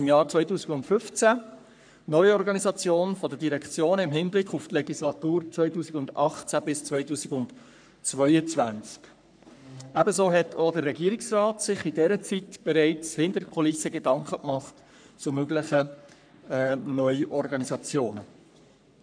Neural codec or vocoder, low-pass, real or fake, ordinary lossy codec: codec, 44.1 kHz, 3.4 kbps, Pupu-Codec; 9.9 kHz; fake; none